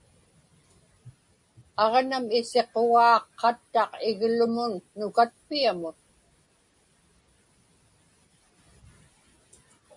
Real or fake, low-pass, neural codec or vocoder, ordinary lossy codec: real; 10.8 kHz; none; MP3, 48 kbps